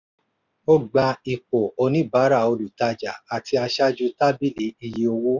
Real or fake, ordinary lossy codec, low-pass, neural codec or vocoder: real; none; 7.2 kHz; none